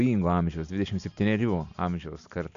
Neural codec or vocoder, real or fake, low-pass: none; real; 7.2 kHz